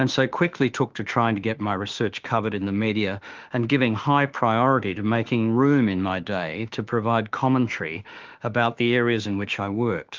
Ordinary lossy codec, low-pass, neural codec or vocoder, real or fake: Opus, 32 kbps; 7.2 kHz; autoencoder, 48 kHz, 32 numbers a frame, DAC-VAE, trained on Japanese speech; fake